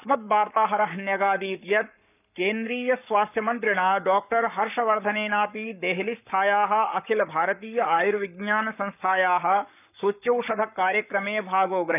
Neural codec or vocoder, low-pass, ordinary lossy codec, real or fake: codec, 44.1 kHz, 7.8 kbps, Pupu-Codec; 3.6 kHz; none; fake